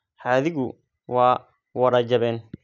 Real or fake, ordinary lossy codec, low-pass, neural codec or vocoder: real; none; 7.2 kHz; none